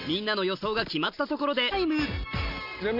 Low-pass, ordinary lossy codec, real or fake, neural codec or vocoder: 5.4 kHz; none; real; none